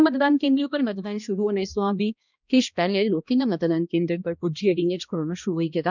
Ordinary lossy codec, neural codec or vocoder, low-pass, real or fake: none; codec, 16 kHz, 1 kbps, X-Codec, HuBERT features, trained on balanced general audio; 7.2 kHz; fake